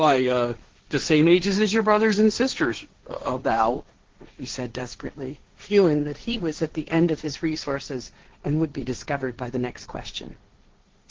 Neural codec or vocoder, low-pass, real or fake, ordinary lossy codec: codec, 16 kHz, 1.1 kbps, Voila-Tokenizer; 7.2 kHz; fake; Opus, 16 kbps